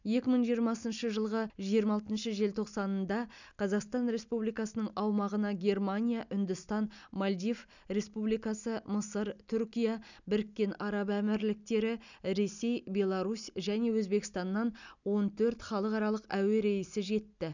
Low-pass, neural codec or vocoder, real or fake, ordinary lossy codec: 7.2 kHz; none; real; none